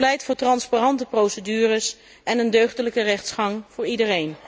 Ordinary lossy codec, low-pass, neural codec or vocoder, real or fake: none; none; none; real